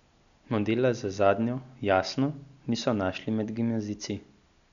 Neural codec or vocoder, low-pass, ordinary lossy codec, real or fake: none; 7.2 kHz; none; real